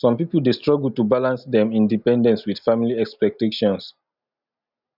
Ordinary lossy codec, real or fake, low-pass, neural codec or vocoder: none; real; 5.4 kHz; none